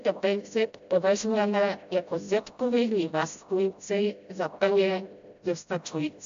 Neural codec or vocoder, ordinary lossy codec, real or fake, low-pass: codec, 16 kHz, 0.5 kbps, FreqCodec, smaller model; AAC, 64 kbps; fake; 7.2 kHz